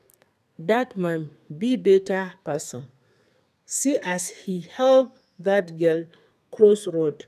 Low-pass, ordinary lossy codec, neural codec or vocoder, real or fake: 14.4 kHz; none; codec, 32 kHz, 1.9 kbps, SNAC; fake